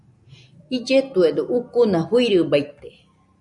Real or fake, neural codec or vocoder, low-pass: real; none; 10.8 kHz